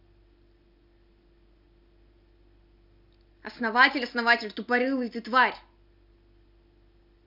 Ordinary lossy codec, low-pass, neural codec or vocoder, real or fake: none; 5.4 kHz; none; real